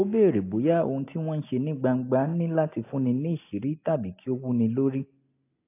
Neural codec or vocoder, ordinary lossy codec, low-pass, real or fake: none; AAC, 24 kbps; 3.6 kHz; real